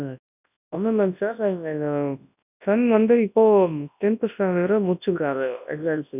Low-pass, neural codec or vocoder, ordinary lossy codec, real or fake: 3.6 kHz; codec, 24 kHz, 0.9 kbps, WavTokenizer, large speech release; none; fake